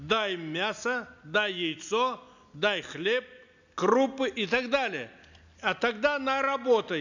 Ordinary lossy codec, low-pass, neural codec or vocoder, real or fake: none; 7.2 kHz; none; real